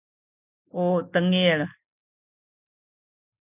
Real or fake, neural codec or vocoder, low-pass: real; none; 3.6 kHz